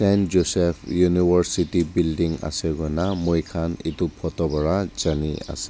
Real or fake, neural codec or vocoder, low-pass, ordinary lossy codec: real; none; none; none